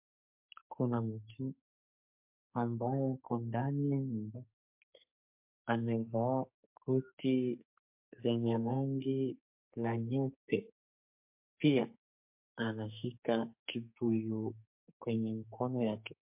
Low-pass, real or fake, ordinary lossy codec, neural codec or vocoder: 3.6 kHz; fake; MP3, 32 kbps; codec, 44.1 kHz, 2.6 kbps, SNAC